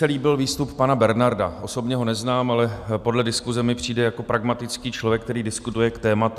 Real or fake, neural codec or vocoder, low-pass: real; none; 14.4 kHz